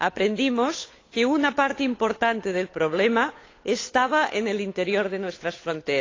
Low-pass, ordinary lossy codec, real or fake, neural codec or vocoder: 7.2 kHz; AAC, 32 kbps; fake; codec, 16 kHz, 8 kbps, FunCodec, trained on Chinese and English, 25 frames a second